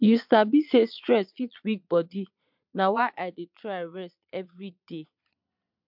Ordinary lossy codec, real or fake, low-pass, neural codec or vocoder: MP3, 48 kbps; fake; 5.4 kHz; vocoder, 22.05 kHz, 80 mel bands, Vocos